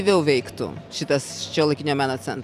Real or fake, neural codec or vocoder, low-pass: fake; vocoder, 44.1 kHz, 128 mel bands every 256 samples, BigVGAN v2; 14.4 kHz